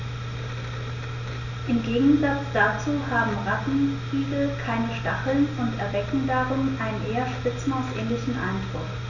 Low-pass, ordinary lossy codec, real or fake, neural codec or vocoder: 7.2 kHz; none; real; none